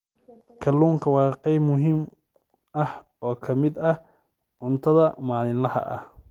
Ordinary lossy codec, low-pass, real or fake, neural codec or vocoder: Opus, 24 kbps; 19.8 kHz; fake; vocoder, 44.1 kHz, 128 mel bands every 256 samples, BigVGAN v2